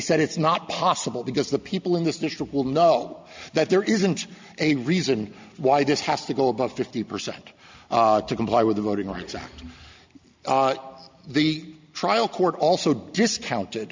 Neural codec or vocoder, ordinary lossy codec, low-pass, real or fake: none; MP3, 64 kbps; 7.2 kHz; real